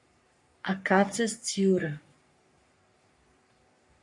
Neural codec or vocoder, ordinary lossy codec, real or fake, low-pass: codec, 44.1 kHz, 3.4 kbps, Pupu-Codec; MP3, 48 kbps; fake; 10.8 kHz